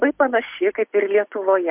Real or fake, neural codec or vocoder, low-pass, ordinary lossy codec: real; none; 3.6 kHz; MP3, 32 kbps